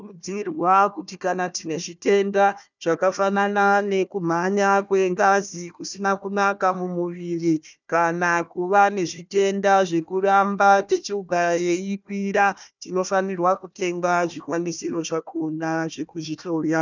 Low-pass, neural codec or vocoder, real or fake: 7.2 kHz; codec, 16 kHz, 1 kbps, FunCodec, trained on Chinese and English, 50 frames a second; fake